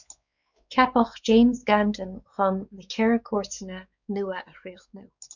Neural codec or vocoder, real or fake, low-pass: codec, 16 kHz, 4 kbps, X-Codec, WavLM features, trained on Multilingual LibriSpeech; fake; 7.2 kHz